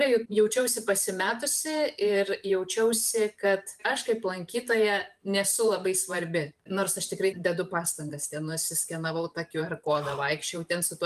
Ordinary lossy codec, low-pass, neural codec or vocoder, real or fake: Opus, 32 kbps; 14.4 kHz; vocoder, 44.1 kHz, 128 mel bands every 512 samples, BigVGAN v2; fake